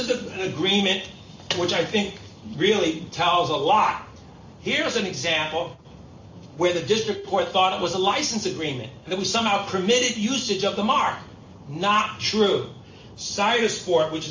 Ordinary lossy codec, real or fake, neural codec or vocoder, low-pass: AAC, 48 kbps; real; none; 7.2 kHz